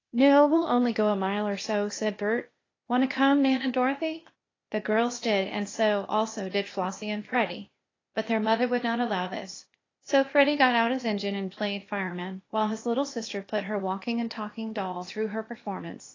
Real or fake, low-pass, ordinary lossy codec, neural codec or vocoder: fake; 7.2 kHz; AAC, 32 kbps; codec, 16 kHz, 0.8 kbps, ZipCodec